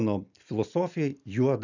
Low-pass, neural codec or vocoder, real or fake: 7.2 kHz; none; real